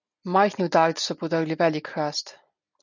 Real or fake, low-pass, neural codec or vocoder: real; 7.2 kHz; none